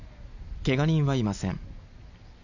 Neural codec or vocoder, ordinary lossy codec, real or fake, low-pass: none; none; real; 7.2 kHz